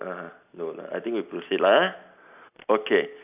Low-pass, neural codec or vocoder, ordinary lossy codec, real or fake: 3.6 kHz; none; none; real